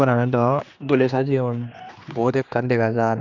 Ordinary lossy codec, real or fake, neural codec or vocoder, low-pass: none; fake; codec, 16 kHz, 2 kbps, X-Codec, HuBERT features, trained on general audio; 7.2 kHz